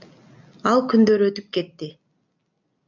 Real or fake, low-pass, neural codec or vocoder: real; 7.2 kHz; none